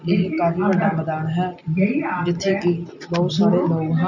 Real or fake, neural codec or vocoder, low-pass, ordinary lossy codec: real; none; 7.2 kHz; none